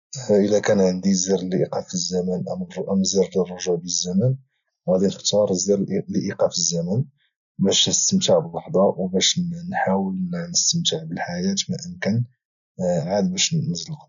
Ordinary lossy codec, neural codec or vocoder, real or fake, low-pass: none; none; real; 7.2 kHz